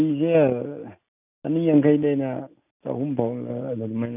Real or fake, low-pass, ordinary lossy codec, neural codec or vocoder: real; 3.6 kHz; none; none